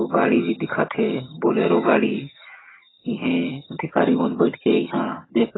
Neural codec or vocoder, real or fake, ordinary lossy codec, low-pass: vocoder, 22.05 kHz, 80 mel bands, HiFi-GAN; fake; AAC, 16 kbps; 7.2 kHz